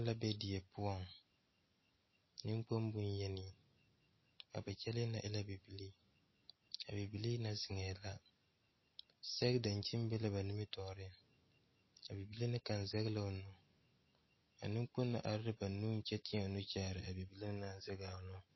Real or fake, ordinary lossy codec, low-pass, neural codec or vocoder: real; MP3, 24 kbps; 7.2 kHz; none